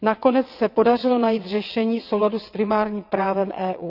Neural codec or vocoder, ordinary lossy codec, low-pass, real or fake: vocoder, 22.05 kHz, 80 mel bands, WaveNeXt; none; 5.4 kHz; fake